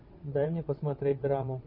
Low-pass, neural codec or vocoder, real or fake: 5.4 kHz; vocoder, 22.05 kHz, 80 mel bands, WaveNeXt; fake